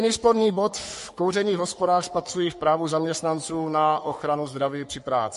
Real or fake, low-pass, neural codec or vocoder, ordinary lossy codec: fake; 14.4 kHz; codec, 44.1 kHz, 3.4 kbps, Pupu-Codec; MP3, 48 kbps